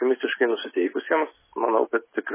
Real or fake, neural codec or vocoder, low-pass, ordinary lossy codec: real; none; 3.6 kHz; MP3, 16 kbps